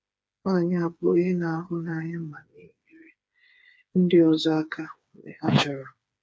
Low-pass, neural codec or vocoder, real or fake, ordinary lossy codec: none; codec, 16 kHz, 4 kbps, FreqCodec, smaller model; fake; none